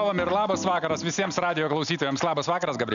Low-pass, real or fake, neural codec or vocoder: 7.2 kHz; real; none